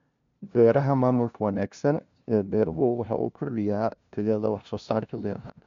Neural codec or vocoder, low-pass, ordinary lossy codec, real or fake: codec, 16 kHz, 0.5 kbps, FunCodec, trained on LibriTTS, 25 frames a second; 7.2 kHz; MP3, 64 kbps; fake